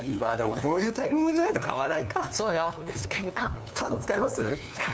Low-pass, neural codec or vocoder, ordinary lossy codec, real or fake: none; codec, 16 kHz, 2 kbps, FunCodec, trained on LibriTTS, 25 frames a second; none; fake